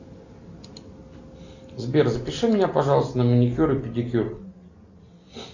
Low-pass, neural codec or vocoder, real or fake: 7.2 kHz; none; real